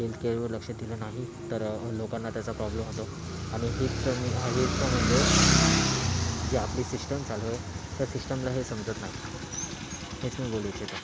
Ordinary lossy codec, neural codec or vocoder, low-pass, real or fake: none; none; none; real